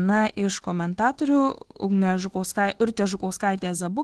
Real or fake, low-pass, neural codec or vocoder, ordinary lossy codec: fake; 10.8 kHz; codec, 24 kHz, 1.2 kbps, DualCodec; Opus, 16 kbps